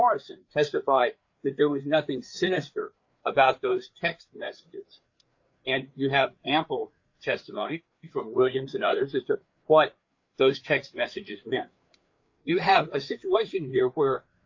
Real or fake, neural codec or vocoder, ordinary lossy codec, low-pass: fake; codec, 16 kHz, 4 kbps, FreqCodec, larger model; AAC, 48 kbps; 7.2 kHz